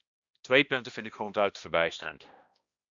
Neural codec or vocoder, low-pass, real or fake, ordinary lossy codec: codec, 16 kHz, 1 kbps, X-Codec, HuBERT features, trained on balanced general audio; 7.2 kHz; fake; Opus, 64 kbps